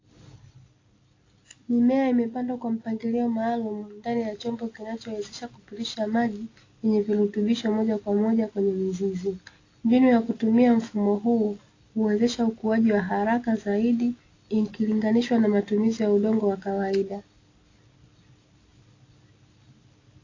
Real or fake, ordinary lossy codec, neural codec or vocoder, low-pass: real; AAC, 32 kbps; none; 7.2 kHz